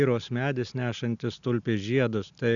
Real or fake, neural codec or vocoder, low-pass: real; none; 7.2 kHz